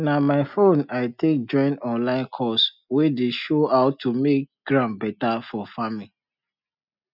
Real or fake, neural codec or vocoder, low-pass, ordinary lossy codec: real; none; 5.4 kHz; none